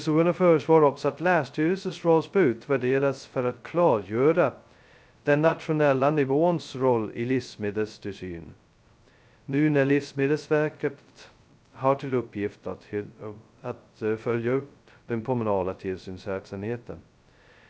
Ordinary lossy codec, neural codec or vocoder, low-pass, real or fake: none; codec, 16 kHz, 0.2 kbps, FocalCodec; none; fake